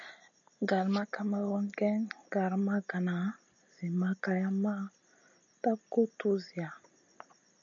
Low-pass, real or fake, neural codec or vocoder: 7.2 kHz; real; none